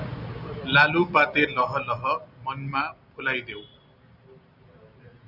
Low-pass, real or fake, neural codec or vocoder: 5.4 kHz; real; none